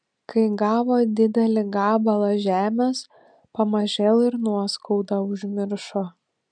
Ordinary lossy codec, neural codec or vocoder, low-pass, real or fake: MP3, 96 kbps; none; 9.9 kHz; real